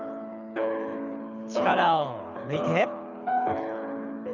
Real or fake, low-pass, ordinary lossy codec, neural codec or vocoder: fake; 7.2 kHz; none; codec, 24 kHz, 6 kbps, HILCodec